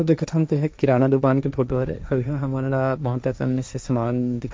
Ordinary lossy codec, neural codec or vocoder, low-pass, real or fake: none; codec, 16 kHz, 1.1 kbps, Voila-Tokenizer; none; fake